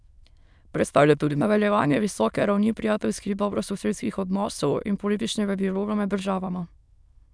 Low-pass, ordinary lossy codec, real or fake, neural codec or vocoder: none; none; fake; autoencoder, 22.05 kHz, a latent of 192 numbers a frame, VITS, trained on many speakers